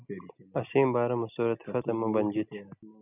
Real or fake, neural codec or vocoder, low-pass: real; none; 3.6 kHz